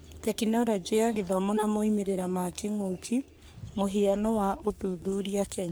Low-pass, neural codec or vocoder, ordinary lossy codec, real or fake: none; codec, 44.1 kHz, 3.4 kbps, Pupu-Codec; none; fake